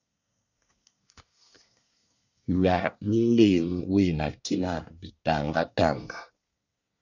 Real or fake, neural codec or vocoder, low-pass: fake; codec, 24 kHz, 1 kbps, SNAC; 7.2 kHz